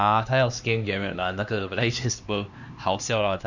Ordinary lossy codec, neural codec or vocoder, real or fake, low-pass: none; codec, 16 kHz, 2 kbps, X-Codec, HuBERT features, trained on LibriSpeech; fake; 7.2 kHz